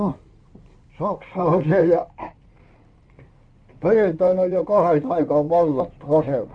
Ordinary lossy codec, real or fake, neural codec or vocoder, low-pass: none; fake; codec, 16 kHz in and 24 kHz out, 2.2 kbps, FireRedTTS-2 codec; 9.9 kHz